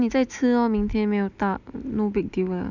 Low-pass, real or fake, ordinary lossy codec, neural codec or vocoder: 7.2 kHz; real; none; none